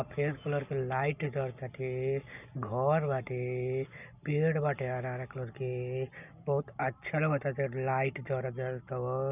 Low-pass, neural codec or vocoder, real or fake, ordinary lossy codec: 3.6 kHz; none; real; none